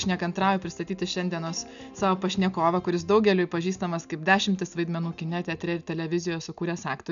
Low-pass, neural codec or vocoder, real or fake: 7.2 kHz; none; real